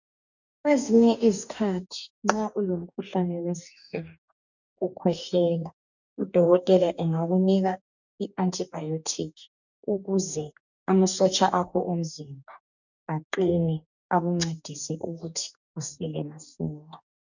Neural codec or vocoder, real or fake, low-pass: codec, 44.1 kHz, 2.6 kbps, DAC; fake; 7.2 kHz